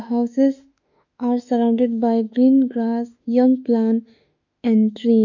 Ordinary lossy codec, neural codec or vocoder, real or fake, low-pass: none; autoencoder, 48 kHz, 32 numbers a frame, DAC-VAE, trained on Japanese speech; fake; 7.2 kHz